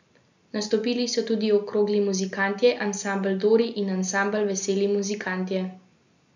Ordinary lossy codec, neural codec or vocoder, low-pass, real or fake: none; none; 7.2 kHz; real